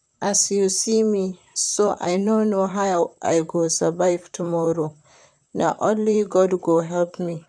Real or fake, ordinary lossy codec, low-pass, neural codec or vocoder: fake; none; 9.9 kHz; vocoder, 44.1 kHz, 128 mel bands, Pupu-Vocoder